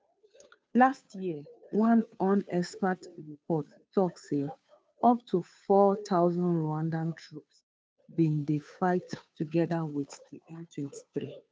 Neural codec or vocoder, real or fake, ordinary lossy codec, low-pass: codec, 16 kHz, 2 kbps, FunCodec, trained on Chinese and English, 25 frames a second; fake; none; none